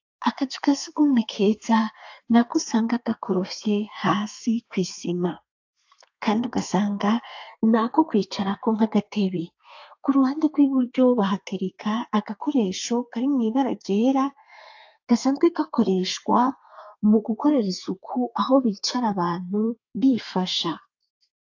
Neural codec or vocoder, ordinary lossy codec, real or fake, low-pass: codec, 32 kHz, 1.9 kbps, SNAC; AAC, 48 kbps; fake; 7.2 kHz